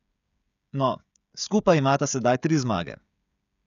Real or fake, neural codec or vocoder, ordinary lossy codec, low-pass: fake; codec, 16 kHz, 16 kbps, FreqCodec, smaller model; none; 7.2 kHz